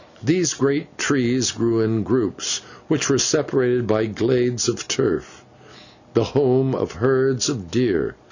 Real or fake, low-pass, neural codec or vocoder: real; 7.2 kHz; none